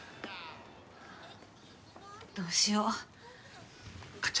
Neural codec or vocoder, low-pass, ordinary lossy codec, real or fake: none; none; none; real